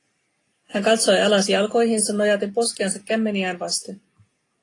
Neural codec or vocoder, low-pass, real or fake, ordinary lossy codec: none; 10.8 kHz; real; AAC, 32 kbps